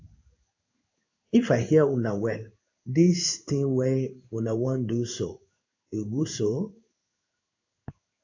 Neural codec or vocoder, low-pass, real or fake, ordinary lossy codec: codec, 16 kHz in and 24 kHz out, 1 kbps, XY-Tokenizer; 7.2 kHz; fake; AAC, 48 kbps